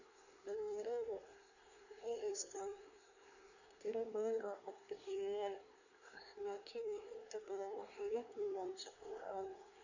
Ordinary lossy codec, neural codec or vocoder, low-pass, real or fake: none; codec, 24 kHz, 1 kbps, SNAC; 7.2 kHz; fake